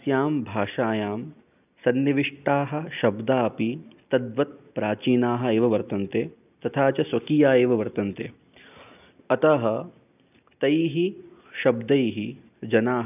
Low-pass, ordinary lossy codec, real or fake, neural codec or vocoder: 3.6 kHz; none; real; none